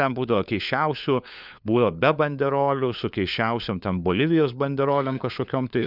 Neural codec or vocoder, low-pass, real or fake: codec, 16 kHz, 4 kbps, FunCodec, trained on LibriTTS, 50 frames a second; 5.4 kHz; fake